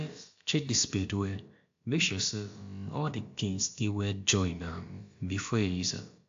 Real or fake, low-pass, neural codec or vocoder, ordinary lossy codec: fake; 7.2 kHz; codec, 16 kHz, about 1 kbps, DyCAST, with the encoder's durations; AAC, 48 kbps